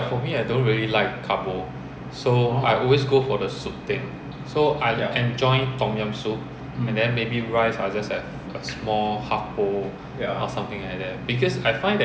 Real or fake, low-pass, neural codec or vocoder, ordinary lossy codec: real; none; none; none